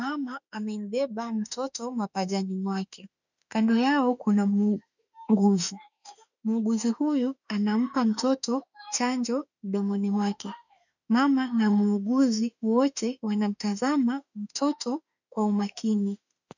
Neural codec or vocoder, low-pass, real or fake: autoencoder, 48 kHz, 32 numbers a frame, DAC-VAE, trained on Japanese speech; 7.2 kHz; fake